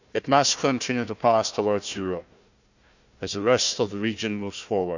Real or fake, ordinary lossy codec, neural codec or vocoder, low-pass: fake; none; codec, 16 kHz, 1 kbps, FunCodec, trained on Chinese and English, 50 frames a second; 7.2 kHz